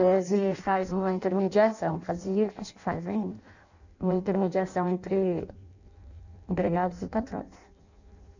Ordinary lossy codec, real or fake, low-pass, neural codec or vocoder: none; fake; 7.2 kHz; codec, 16 kHz in and 24 kHz out, 0.6 kbps, FireRedTTS-2 codec